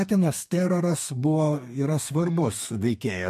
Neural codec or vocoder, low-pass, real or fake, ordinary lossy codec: codec, 32 kHz, 1.9 kbps, SNAC; 14.4 kHz; fake; MP3, 64 kbps